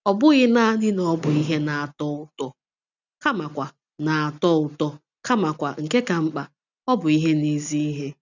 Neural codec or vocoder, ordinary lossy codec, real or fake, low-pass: none; none; real; 7.2 kHz